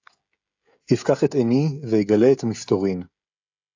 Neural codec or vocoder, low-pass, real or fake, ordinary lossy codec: codec, 16 kHz, 16 kbps, FreqCodec, smaller model; 7.2 kHz; fake; AAC, 48 kbps